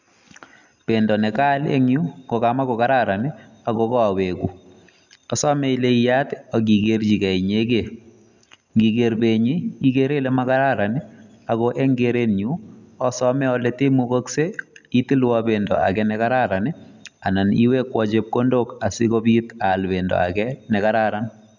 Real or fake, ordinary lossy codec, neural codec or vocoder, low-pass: real; none; none; 7.2 kHz